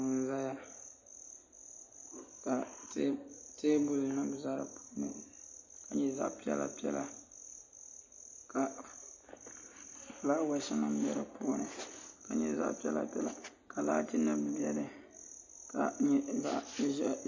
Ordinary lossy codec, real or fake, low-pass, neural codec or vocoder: MP3, 32 kbps; real; 7.2 kHz; none